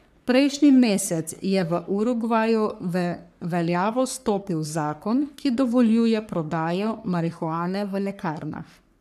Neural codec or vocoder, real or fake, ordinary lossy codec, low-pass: codec, 44.1 kHz, 3.4 kbps, Pupu-Codec; fake; none; 14.4 kHz